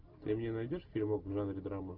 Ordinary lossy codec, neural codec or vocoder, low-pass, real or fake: Opus, 32 kbps; none; 5.4 kHz; real